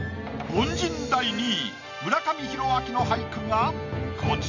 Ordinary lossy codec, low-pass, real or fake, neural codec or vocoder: none; 7.2 kHz; real; none